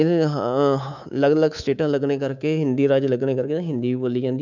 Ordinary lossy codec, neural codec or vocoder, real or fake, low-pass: none; codec, 24 kHz, 3.1 kbps, DualCodec; fake; 7.2 kHz